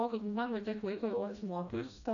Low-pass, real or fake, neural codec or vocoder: 7.2 kHz; fake; codec, 16 kHz, 1 kbps, FreqCodec, smaller model